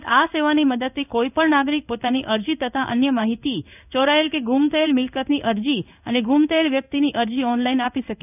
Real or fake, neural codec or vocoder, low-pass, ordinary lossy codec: fake; codec, 16 kHz in and 24 kHz out, 1 kbps, XY-Tokenizer; 3.6 kHz; none